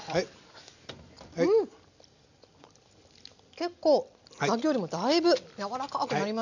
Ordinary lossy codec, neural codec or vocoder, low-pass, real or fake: none; none; 7.2 kHz; real